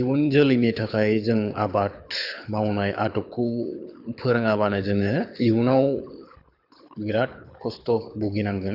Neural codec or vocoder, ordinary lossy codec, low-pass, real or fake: codec, 24 kHz, 6 kbps, HILCodec; none; 5.4 kHz; fake